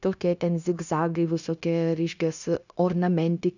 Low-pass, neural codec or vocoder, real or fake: 7.2 kHz; codec, 16 kHz, 0.9 kbps, LongCat-Audio-Codec; fake